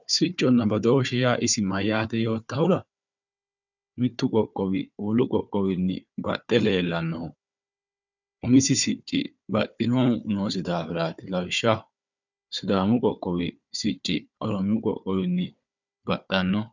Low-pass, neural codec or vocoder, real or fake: 7.2 kHz; codec, 16 kHz, 4 kbps, FunCodec, trained on Chinese and English, 50 frames a second; fake